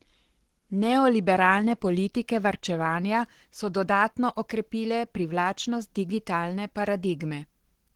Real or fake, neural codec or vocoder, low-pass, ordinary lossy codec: fake; codec, 44.1 kHz, 7.8 kbps, Pupu-Codec; 19.8 kHz; Opus, 16 kbps